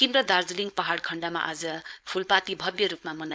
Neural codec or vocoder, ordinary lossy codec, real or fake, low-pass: codec, 16 kHz, 4.8 kbps, FACodec; none; fake; none